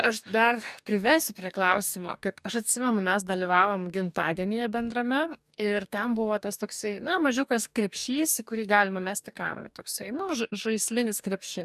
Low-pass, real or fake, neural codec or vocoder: 14.4 kHz; fake; codec, 44.1 kHz, 2.6 kbps, DAC